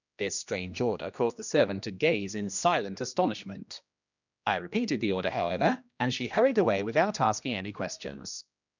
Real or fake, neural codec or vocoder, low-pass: fake; codec, 16 kHz, 1 kbps, X-Codec, HuBERT features, trained on general audio; 7.2 kHz